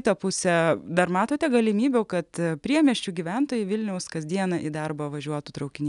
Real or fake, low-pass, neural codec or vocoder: real; 10.8 kHz; none